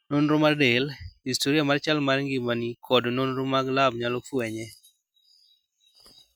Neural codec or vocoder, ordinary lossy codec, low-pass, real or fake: none; none; none; real